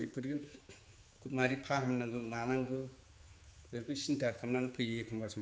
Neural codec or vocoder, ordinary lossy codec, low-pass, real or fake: codec, 16 kHz, 4 kbps, X-Codec, HuBERT features, trained on general audio; none; none; fake